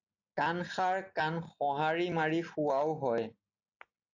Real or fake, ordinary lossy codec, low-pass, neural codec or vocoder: real; AAC, 48 kbps; 7.2 kHz; none